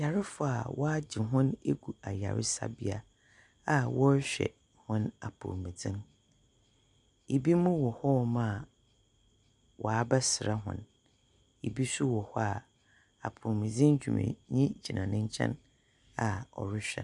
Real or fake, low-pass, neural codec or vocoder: real; 10.8 kHz; none